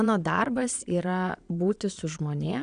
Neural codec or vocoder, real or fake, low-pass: vocoder, 22.05 kHz, 80 mel bands, Vocos; fake; 9.9 kHz